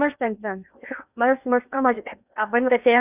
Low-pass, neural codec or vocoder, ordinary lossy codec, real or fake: 3.6 kHz; codec, 16 kHz in and 24 kHz out, 0.8 kbps, FocalCodec, streaming, 65536 codes; none; fake